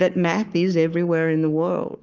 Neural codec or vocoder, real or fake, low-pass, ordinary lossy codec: codec, 44.1 kHz, 7.8 kbps, Pupu-Codec; fake; 7.2 kHz; Opus, 24 kbps